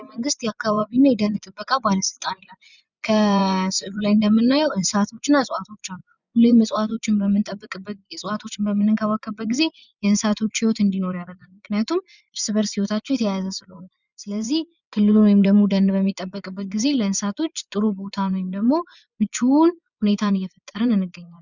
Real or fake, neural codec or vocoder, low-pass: fake; vocoder, 24 kHz, 100 mel bands, Vocos; 7.2 kHz